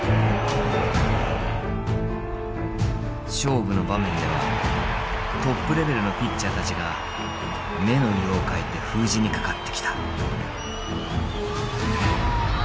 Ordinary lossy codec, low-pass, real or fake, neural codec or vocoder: none; none; real; none